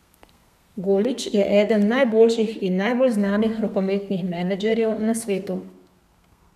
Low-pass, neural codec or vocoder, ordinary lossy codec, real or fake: 14.4 kHz; codec, 32 kHz, 1.9 kbps, SNAC; none; fake